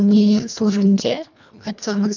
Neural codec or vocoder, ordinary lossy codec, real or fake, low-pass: codec, 24 kHz, 1.5 kbps, HILCodec; none; fake; 7.2 kHz